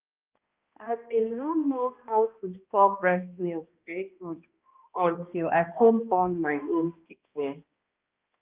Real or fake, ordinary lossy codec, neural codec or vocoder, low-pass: fake; Opus, 24 kbps; codec, 16 kHz, 1 kbps, X-Codec, HuBERT features, trained on balanced general audio; 3.6 kHz